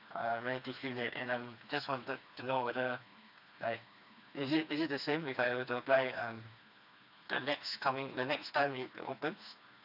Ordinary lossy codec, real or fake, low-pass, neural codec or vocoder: none; fake; 5.4 kHz; codec, 16 kHz, 2 kbps, FreqCodec, smaller model